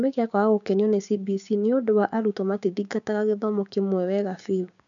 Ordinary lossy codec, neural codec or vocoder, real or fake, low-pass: none; codec, 16 kHz, 6 kbps, DAC; fake; 7.2 kHz